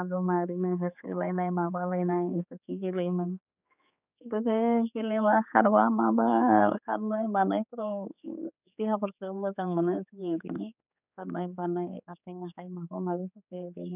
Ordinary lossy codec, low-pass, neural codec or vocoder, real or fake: none; 3.6 kHz; codec, 16 kHz, 4 kbps, X-Codec, HuBERT features, trained on general audio; fake